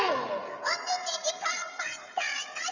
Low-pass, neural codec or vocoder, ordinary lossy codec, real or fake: 7.2 kHz; codec, 16 kHz, 16 kbps, FreqCodec, smaller model; Opus, 64 kbps; fake